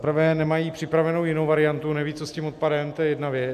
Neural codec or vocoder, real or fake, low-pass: none; real; 14.4 kHz